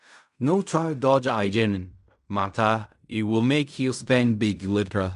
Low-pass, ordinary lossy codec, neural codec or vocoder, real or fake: 10.8 kHz; none; codec, 16 kHz in and 24 kHz out, 0.4 kbps, LongCat-Audio-Codec, fine tuned four codebook decoder; fake